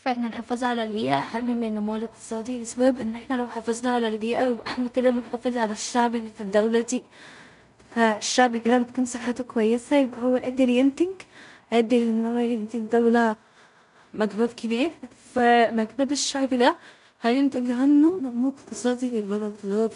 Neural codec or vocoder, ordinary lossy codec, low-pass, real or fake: codec, 16 kHz in and 24 kHz out, 0.4 kbps, LongCat-Audio-Codec, two codebook decoder; none; 10.8 kHz; fake